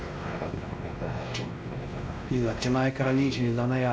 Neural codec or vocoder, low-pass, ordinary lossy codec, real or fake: codec, 16 kHz, 1 kbps, X-Codec, WavLM features, trained on Multilingual LibriSpeech; none; none; fake